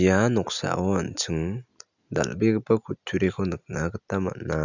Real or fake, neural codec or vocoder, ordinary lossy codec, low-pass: real; none; none; 7.2 kHz